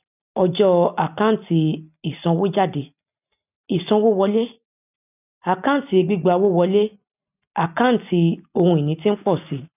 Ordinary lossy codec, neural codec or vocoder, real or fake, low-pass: none; none; real; 3.6 kHz